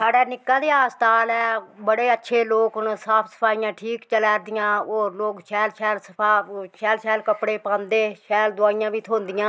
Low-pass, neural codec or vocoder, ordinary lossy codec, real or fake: none; none; none; real